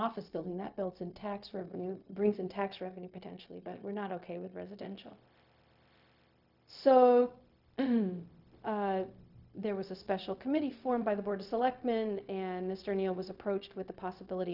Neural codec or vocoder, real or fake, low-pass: codec, 16 kHz, 0.4 kbps, LongCat-Audio-Codec; fake; 5.4 kHz